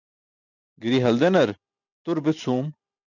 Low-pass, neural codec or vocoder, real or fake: 7.2 kHz; none; real